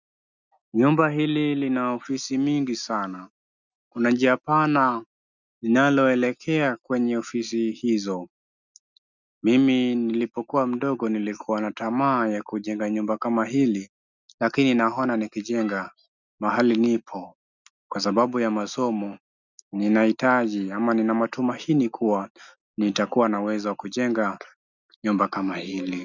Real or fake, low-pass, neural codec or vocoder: real; 7.2 kHz; none